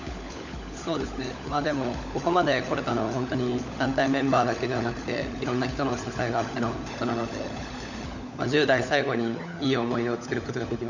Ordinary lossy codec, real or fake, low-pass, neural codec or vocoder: none; fake; 7.2 kHz; codec, 16 kHz, 16 kbps, FunCodec, trained on LibriTTS, 50 frames a second